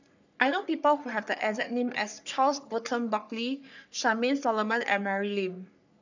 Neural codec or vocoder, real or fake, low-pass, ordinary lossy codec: codec, 44.1 kHz, 3.4 kbps, Pupu-Codec; fake; 7.2 kHz; none